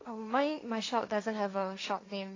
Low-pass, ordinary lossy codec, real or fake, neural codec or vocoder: 7.2 kHz; AAC, 32 kbps; fake; codec, 16 kHz, 1.1 kbps, Voila-Tokenizer